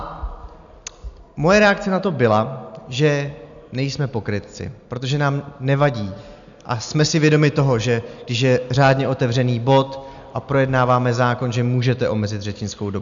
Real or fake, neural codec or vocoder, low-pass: real; none; 7.2 kHz